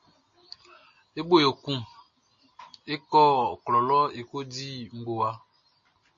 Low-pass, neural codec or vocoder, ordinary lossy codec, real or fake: 7.2 kHz; none; MP3, 32 kbps; real